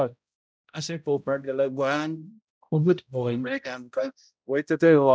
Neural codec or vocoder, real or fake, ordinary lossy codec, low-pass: codec, 16 kHz, 0.5 kbps, X-Codec, HuBERT features, trained on balanced general audio; fake; none; none